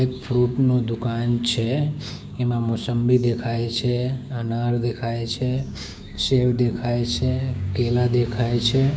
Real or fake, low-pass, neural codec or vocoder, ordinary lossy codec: fake; none; codec, 16 kHz, 6 kbps, DAC; none